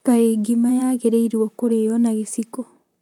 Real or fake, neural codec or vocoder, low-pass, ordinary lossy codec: fake; vocoder, 44.1 kHz, 128 mel bands, Pupu-Vocoder; 19.8 kHz; none